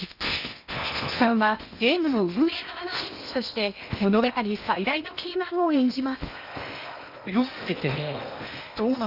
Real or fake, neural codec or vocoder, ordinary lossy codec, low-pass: fake; codec, 16 kHz in and 24 kHz out, 0.8 kbps, FocalCodec, streaming, 65536 codes; none; 5.4 kHz